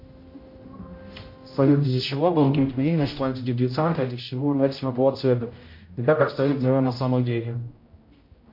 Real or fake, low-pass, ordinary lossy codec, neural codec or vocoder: fake; 5.4 kHz; MP3, 32 kbps; codec, 16 kHz, 0.5 kbps, X-Codec, HuBERT features, trained on general audio